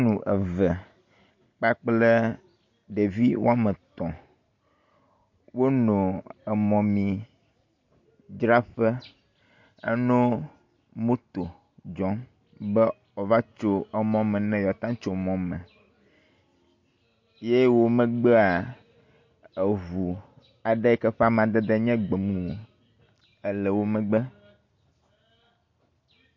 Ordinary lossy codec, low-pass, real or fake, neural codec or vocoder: MP3, 48 kbps; 7.2 kHz; real; none